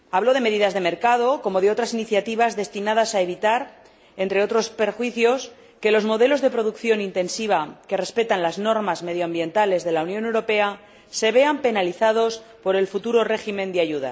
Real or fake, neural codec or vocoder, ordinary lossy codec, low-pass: real; none; none; none